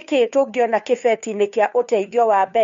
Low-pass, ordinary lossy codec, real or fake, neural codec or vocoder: 7.2 kHz; MP3, 48 kbps; fake; codec, 16 kHz, 2 kbps, FunCodec, trained on Chinese and English, 25 frames a second